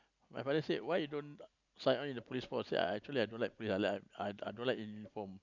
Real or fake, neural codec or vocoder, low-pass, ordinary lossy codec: real; none; 7.2 kHz; none